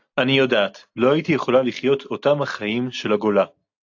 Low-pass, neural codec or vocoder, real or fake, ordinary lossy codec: 7.2 kHz; none; real; AAC, 48 kbps